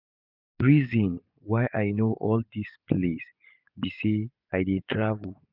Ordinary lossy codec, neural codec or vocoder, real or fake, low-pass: none; vocoder, 24 kHz, 100 mel bands, Vocos; fake; 5.4 kHz